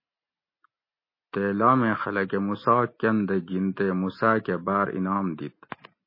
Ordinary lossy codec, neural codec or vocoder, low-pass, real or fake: MP3, 24 kbps; none; 5.4 kHz; real